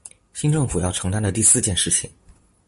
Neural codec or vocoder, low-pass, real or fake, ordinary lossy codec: none; 14.4 kHz; real; MP3, 48 kbps